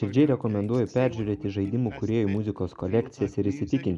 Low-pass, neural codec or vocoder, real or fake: 10.8 kHz; none; real